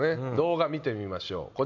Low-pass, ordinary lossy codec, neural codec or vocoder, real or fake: 7.2 kHz; none; none; real